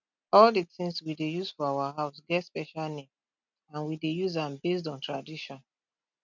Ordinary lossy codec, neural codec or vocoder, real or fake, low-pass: none; none; real; 7.2 kHz